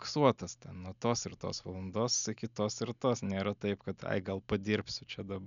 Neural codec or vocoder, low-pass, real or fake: none; 7.2 kHz; real